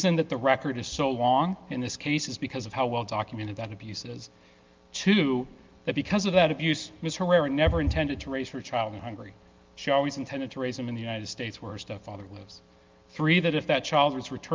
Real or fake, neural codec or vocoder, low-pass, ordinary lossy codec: real; none; 7.2 kHz; Opus, 24 kbps